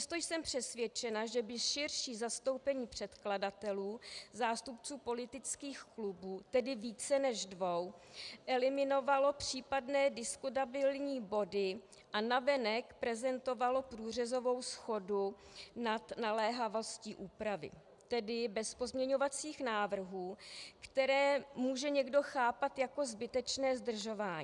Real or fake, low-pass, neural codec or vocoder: real; 10.8 kHz; none